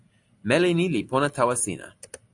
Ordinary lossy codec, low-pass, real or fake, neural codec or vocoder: AAC, 64 kbps; 10.8 kHz; real; none